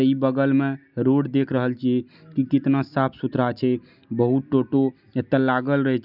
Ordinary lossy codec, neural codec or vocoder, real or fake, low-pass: none; none; real; 5.4 kHz